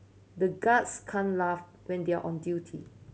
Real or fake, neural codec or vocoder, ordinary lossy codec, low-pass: real; none; none; none